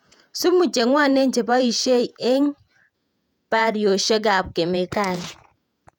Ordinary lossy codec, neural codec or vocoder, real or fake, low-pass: none; vocoder, 48 kHz, 128 mel bands, Vocos; fake; 19.8 kHz